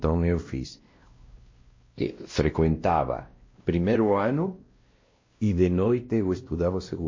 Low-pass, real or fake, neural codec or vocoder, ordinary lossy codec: 7.2 kHz; fake; codec, 16 kHz, 1 kbps, X-Codec, WavLM features, trained on Multilingual LibriSpeech; MP3, 32 kbps